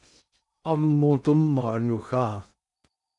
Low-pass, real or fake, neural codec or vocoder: 10.8 kHz; fake; codec, 16 kHz in and 24 kHz out, 0.6 kbps, FocalCodec, streaming, 2048 codes